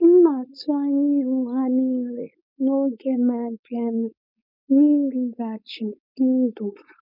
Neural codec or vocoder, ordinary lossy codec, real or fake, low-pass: codec, 16 kHz, 4.8 kbps, FACodec; none; fake; 5.4 kHz